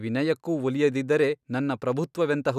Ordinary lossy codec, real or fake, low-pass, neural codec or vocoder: none; real; 14.4 kHz; none